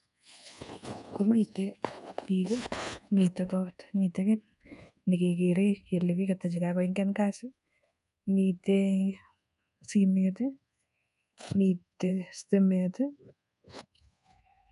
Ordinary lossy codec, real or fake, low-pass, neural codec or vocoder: none; fake; 10.8 kHz; codec, 24 kHz, 1.2 kbps, DualCodec